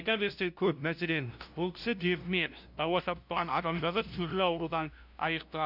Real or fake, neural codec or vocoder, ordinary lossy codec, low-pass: fake; codec, 16 kHz, 0.5 kbps, FunCodec, trained on LibriTTS, 25 frames a second; none; 5.4 kHz